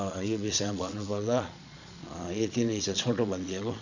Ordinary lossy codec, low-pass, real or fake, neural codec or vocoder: none; 7.2 kHz; fake; vocoder, 22.05 kHz, 80 mel bands, Vocos